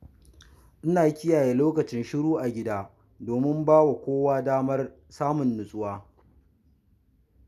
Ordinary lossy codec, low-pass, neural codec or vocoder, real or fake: none; 14.4 kHz; none; real